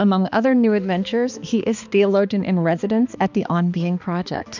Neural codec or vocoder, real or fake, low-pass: codec, 16 kHz, 2 kbps, X-Codec, HuBERT features, trained on balanced general audio; fake; 7.2 kHz